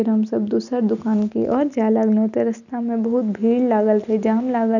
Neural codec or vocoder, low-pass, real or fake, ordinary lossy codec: none; 7.2 kHz; real; none